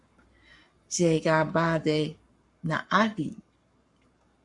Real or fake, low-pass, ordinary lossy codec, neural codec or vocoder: fake; 10.8 kHz; MP3, 64 kbps; codec, 44.1 kHz, 7.8 kbps, Pupu-Codec